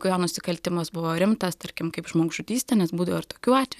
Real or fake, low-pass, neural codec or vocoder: real; 14.4 kHz; none